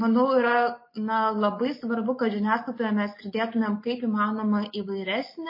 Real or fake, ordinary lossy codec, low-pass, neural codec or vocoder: real; MP3, 24 kbps; 5.4 kHz; none